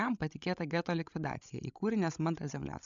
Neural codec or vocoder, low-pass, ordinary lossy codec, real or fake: codec, 16 kHz, 16 kbps, FreqCodec, larger model; 7.2 kHz; MP3, 96 kbps; fake